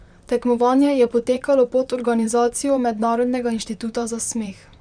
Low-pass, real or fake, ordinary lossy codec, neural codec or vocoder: 9.9 kHz; fake; none; vocoder, 44.1 kHz, 128 mel bands, Pupu-Vocoder